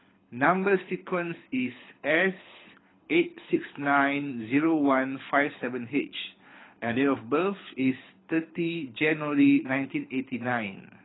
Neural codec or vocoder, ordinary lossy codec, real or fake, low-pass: codec, 24 kHz, 6 kbps, HILCodec; AAC, 16 kbps; fake; 7.2 kHz